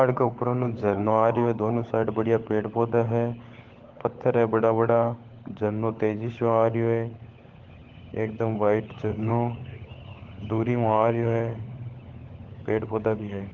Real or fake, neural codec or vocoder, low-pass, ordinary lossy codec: fake; codec, 16 kHz, 16 kbps, FunCodec, trained on LibriTTS, 50 frames a second; 7.2 kHz; Opus, 16 kbps